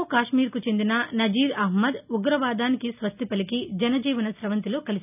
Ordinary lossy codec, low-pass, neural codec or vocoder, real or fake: none; 3.6 kHz; none; real